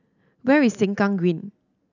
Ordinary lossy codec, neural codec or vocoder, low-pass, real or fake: none; none; 7.2 kHz; real